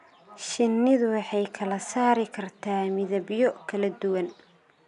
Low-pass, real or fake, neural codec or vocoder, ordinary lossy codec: 10.8 kHz; real; none; none